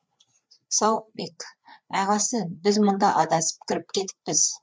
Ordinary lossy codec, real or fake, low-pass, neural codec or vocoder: none; fake; none; codec, 16 kHz, 4 kbps, FreqCodec, larger model